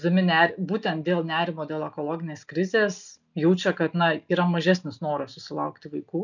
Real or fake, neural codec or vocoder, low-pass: real; none; 7.2 kHz